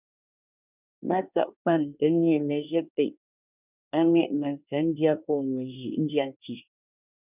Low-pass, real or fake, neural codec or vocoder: 3.6 kHz; fake; codec, 24 kHz, 1 kbps, SNAC